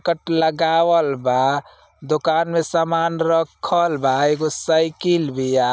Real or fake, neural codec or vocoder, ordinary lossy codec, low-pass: real; none; none; none